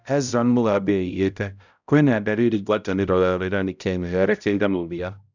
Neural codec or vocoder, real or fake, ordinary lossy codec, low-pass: codec, 16 kHz, 0.5 kbps, X-Codec, HuBERT features, trained on balanced general audio; fake; none; 7.2 kHz